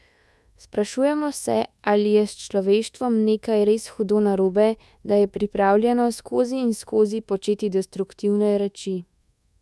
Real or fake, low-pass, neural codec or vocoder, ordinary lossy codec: fake; none; codec, 24 kHz, 1.2 kbps, DualCodec; none